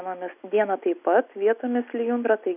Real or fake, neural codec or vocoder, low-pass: real; none; 3.6 kHz